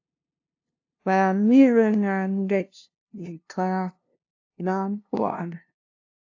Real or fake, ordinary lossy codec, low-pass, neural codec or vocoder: fake; AAC, 48 kbps; 7.2 kHz; codec, 16 kHz, 0.5 kbps, FunCodec, trained on LibriTTS, 25 frames a second